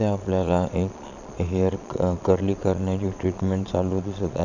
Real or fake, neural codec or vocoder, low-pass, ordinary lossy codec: fake; vocoder, 22.05 kHz, 80 mel bands, Vocos; 7.2 kHz; none